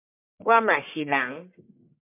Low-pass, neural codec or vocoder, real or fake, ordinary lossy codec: 3.6 kHz; codec, 44.1 kHz, 1.7 kbps, Pupu-Codec; fake; MP3, 32 kbps